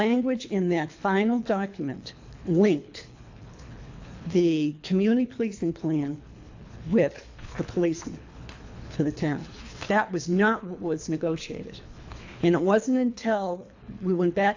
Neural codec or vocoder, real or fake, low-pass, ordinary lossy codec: codec, 24 kHz, 3 kbps, HILCodec; fake; 7.2 kHz; AAC, 48 kbps